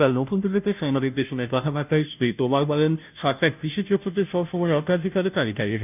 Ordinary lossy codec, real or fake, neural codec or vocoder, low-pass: none; fake; codec, 16 kHz, 0.5 kbps, FunCodec, trained on Chinese and English, 25 frames a second; 3.6 kHz